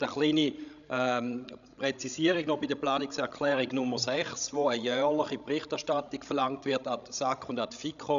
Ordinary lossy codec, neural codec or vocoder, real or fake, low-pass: none; codec, 16 kHz, 16 kbps, FreqCodec, larger model; fake; 7.2 kHz